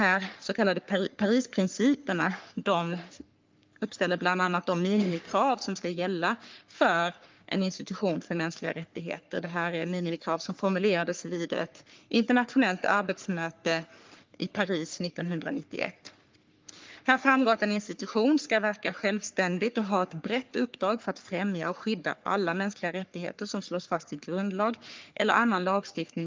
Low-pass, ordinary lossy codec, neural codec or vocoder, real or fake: 7.2 kHz; Opus, 24 kbps; codec, 44.1 kHz, 3.4 kbps, Pupu-Codec; fake